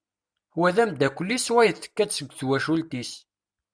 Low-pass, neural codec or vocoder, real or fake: 9.9 kHz; none; real